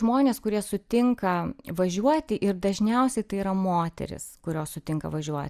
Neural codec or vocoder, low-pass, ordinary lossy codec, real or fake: none; 14.4 kHz; Opus, 64 kbps; real